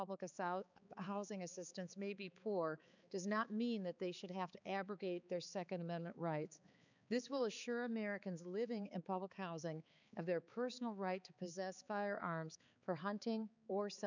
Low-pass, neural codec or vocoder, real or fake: 7.2 kHz; codec, 16 kHz, 4 kbps, X-Codec, HuBERT features, trained on balanced general audio; fake